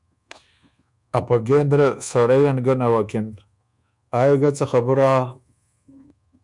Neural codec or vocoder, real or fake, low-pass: codec, 24 kHz, 1.2 kbps, DualCodec; fake; 10.8 kHz